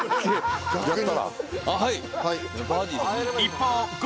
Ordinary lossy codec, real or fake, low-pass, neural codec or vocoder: none; real; none; none